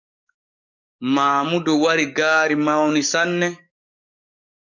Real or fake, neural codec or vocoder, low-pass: fake; codec, 44.1 kHz, 7.8 kbps, DAC; 7.2 kHz